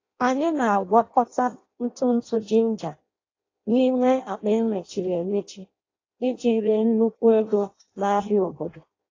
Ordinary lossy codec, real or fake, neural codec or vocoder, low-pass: AAC, 32 kbps; fake; codec, 16 kHz in and 24 kHz out, 0.6 kbps, FireRedTTS-2 codec; 7.2 kHz